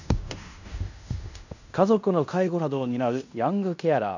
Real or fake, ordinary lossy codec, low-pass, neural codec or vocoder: fake; none; 7.2 kHz; codec, 16 kHz in and 24 kHz out, 0.9 kbps, LongCat-Audio-Codec, fine tuned four codebook decoder